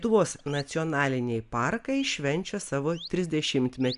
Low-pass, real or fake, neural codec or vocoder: 10.8 kHz; real; none